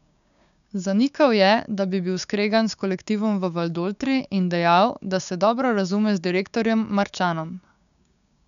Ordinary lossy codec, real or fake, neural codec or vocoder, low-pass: none; fake; codec, 16 kHz, 6 kbps, DAC; 7.2 kHz